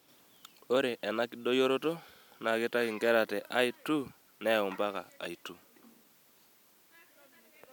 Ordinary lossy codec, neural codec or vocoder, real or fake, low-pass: none; none; real; none